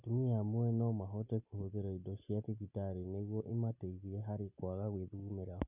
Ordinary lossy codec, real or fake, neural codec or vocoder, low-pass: none; real; none; 3.6 kHz